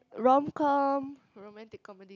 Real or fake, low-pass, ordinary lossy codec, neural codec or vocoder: real; 7.2 kHz; none; none